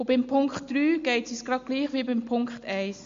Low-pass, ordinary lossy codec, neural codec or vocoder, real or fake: 7.2 kHz; MP3, 48 kbps; none; real